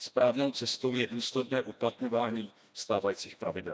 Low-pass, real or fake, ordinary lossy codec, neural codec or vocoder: none; fake; none; codec, 16 kHz, 1 kbps, FreqCodec, smaller model